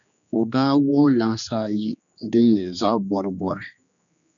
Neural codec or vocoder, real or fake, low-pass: codec, 16 kHz, 2 kbps, X-Codec, HuBERT features, trained on general audio; fake; 7.2 kHz